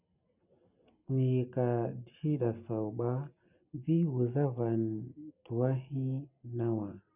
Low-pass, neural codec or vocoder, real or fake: 3.6 kHz; none; real